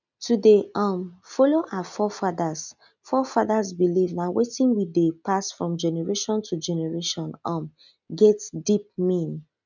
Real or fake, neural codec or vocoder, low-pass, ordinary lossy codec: real; none; 7.2 kHz; none